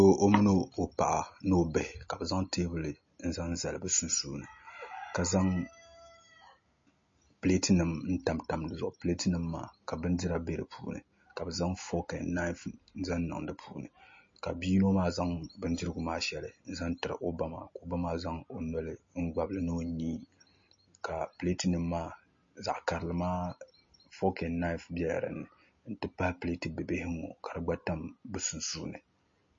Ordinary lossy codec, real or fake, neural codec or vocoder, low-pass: MP3, 32 kbps; real; none; 7.2 kHz